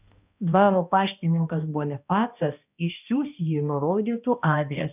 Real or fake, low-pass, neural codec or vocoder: fake; 3.6 kHz; codec, 16 kHz, 1 kbps, X-Codec, HuBERT features, trained on balanced general audio